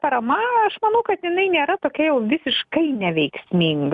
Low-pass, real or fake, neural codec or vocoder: 10.8 kHz; real; none